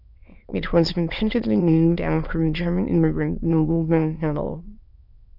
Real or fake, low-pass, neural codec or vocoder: fake; 5.4 kHz; autoencoder, 22.05 kHz, a latent of 192 numbers a frame, VITS, trained on many speakers